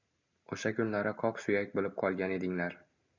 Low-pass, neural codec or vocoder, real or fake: 7.2 kHz; none; real